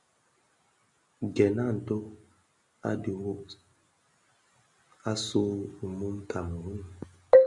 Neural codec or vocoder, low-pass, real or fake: none; 10.8 kHz; real